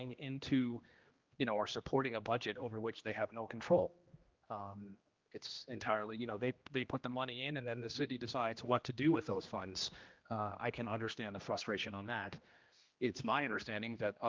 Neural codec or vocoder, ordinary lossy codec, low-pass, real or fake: codec, 16 kHz, 1 kbps, X-Codec, HuBERT features, trained on general audio; Opus, 32 kbps; 7.2 kHz; fake